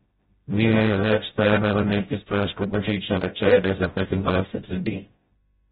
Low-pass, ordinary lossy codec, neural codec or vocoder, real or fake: 7.2 kHz; AAC, 16 kbps; codec, 16 kHz, 0.5 kbps, FreqCodec, smaller model; fake